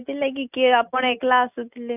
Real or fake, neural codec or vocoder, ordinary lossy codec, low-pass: real; none; none; 3.6 kHz